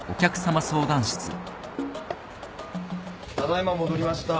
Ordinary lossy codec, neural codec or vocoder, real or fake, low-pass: none; none; real; none